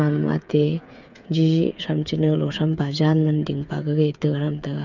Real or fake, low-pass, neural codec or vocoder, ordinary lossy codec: fake; 7.2 kHz; codec, 16 kHz, 8 kbps, FreqCodec, smaller model; none